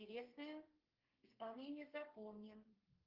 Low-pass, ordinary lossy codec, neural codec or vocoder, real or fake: 5.4 kHz; Opus, 32 kbps; codec, 32 kHz, 1.9 kbps, SNAC; fake